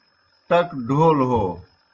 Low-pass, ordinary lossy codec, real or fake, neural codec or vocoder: 7.2 kHz; Opus, 32 kbps; real; none